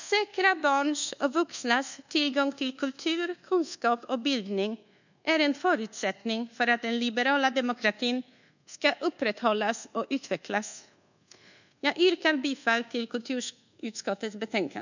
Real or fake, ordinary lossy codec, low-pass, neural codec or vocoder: fake; none; 7.2 kHz; codec, 24 kHz, 1.2 kbps, DualCodec